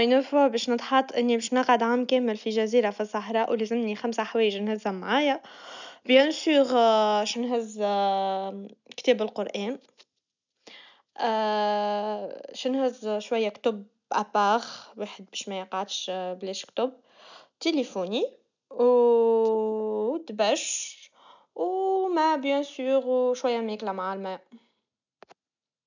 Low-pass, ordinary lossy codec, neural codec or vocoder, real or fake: 7.2 kHz; none; none; real